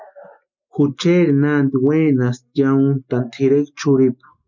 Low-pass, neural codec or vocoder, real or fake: 7.2 kHz; none; real